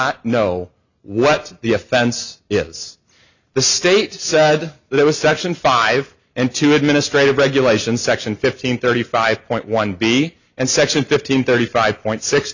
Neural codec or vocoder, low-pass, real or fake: none; 7.2 kHz; real